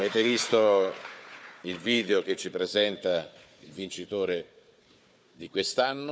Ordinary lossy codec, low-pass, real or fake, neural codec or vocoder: none; none; fake; codec, 16 kHz, 4 kbps, FunCodec, trained on Chinese and English, 50 frames a second